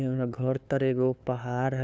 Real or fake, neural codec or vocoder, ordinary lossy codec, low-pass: fake; codec, 16 kHz, 2 kbps, FunCodec, trained on LibriTTS, 25 frames a second; none; none